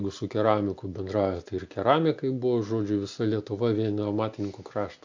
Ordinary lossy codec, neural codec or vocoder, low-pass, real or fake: MP3, 64 kbps; none; 7.2 kHz; real